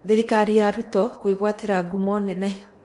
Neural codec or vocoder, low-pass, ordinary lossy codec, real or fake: codec, 16 kHz in and 24 kHz out, 0.8 kbps, FocalCodec, streaming, 65536 codes; 10.8 kHz; MP3, 64 kbps; fake